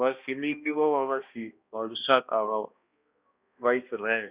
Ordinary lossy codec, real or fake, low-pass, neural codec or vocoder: Opus, 64 kbps; fake; 3.6 kHz; codec, 16 kHz, 1 kbps, X-Codec, HuBERT features, trained on balanced general audio